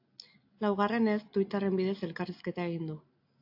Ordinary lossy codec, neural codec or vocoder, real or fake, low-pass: AAC, 48 kbps; none; real; 5.4 kHz